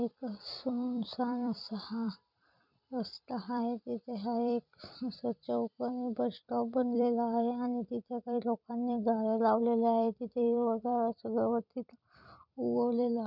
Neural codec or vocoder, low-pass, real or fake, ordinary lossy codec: vocoder, 44.1 kHz, 128 mel bands every 256 samples, BigVGAN v2; 5.4 kHz; fake; none